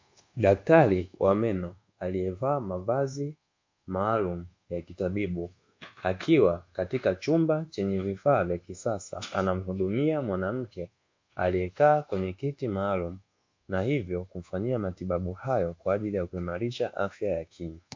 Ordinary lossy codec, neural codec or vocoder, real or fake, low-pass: MP3, 48 kbps; codec, 24 kHz, 1.2 kbps, DualCodec; fake; 7.2 kHz